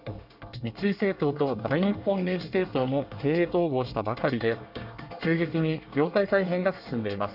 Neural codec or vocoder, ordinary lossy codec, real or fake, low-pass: codec, 24 kHz, 1 kbps, SNAC; none; fake; 5.4 kHz